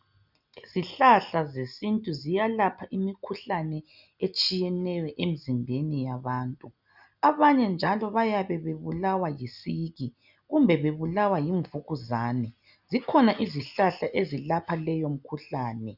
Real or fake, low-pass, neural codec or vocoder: real; 5.4 kHz; none